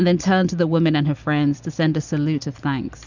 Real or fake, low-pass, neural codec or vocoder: real; 7.2 kHz; none